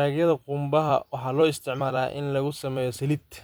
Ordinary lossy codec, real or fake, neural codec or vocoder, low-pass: none; fake; vocoder, 44.1 kHz, 128 mel bands every 256 samples, BigVGAN v2; none